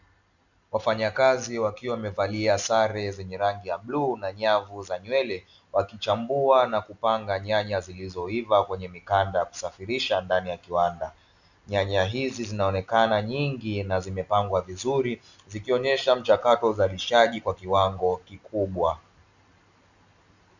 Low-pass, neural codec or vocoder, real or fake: 7.2 kHz; none; real